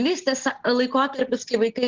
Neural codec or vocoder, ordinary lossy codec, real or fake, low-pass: none; Opus, 16 kbps; real; 7.2 kHz